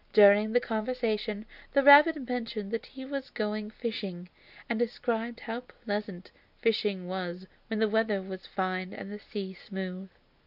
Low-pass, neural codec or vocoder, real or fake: 5.4 kHz; none; real